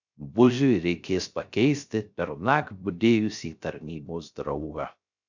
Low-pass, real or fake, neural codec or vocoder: 7.2 kHz; fake; codec, 16 kHz, 0.3 kbps, FocalCodec